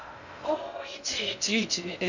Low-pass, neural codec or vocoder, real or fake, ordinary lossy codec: 7.2 kHz; codec, 16 kHz in and 24 kHz out, 0.6 kbps, FocalCodec, streaming, 2048 codes; fake; none